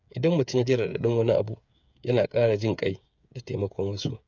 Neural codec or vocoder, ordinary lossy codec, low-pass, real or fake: codec, 16 kHz, 8 kbps, FreqCodec, smaller model; none; 7.2 kHz; fake